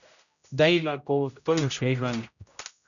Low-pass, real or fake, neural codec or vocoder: 7.2 kHz; fake; codec, 16 kHz, 0.5 kbps, X-Codec, HuBERT features, trained on general audio